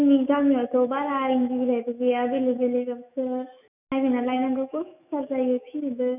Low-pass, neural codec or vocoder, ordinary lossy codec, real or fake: 3.6 kHz; autoencoder, 48 kHz, 128 numbers a frame, DAC-VAE, trained on Japanese speech; none; fake